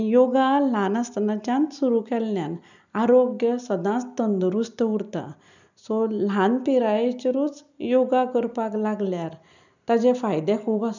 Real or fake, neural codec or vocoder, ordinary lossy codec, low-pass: real; none; none; 7.2 kHz